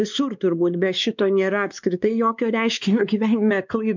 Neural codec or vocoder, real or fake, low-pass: codec, 16 kHz, 2 kbps, X-Codec, WavLM features, trained on Multilingual LibriSpeech; fake; 7.2 kHz